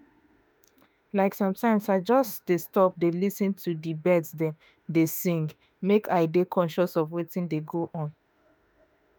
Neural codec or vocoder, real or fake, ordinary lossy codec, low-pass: autoencoder, 48 kHz, 32 numbers a frame, DAC-VAE, trained on Japanese speech; fake; none; none